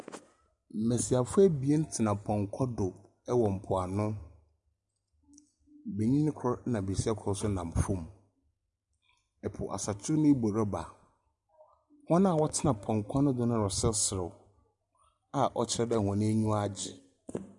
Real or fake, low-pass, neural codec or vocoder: real; 10.8 kHz; none